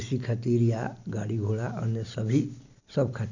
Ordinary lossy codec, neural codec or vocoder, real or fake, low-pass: none; none; real; 7.2 kHz